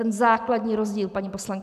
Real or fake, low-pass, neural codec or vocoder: real; 14.4 kHz; none